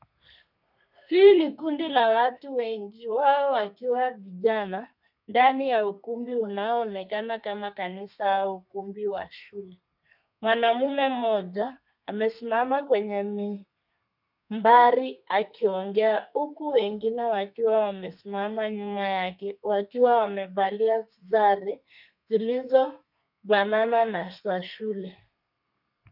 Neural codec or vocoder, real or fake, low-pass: codec, 32 kHz, 1.9 kbps, SNAC; fake; 5.4 kHz